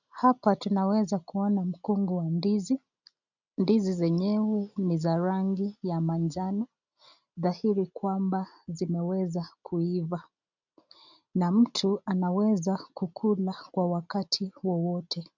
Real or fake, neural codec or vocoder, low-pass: real; none; 7.2 kHz